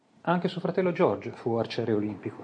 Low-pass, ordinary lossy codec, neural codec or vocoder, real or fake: 9.9 kHz; MP3, 48 kbps; none; real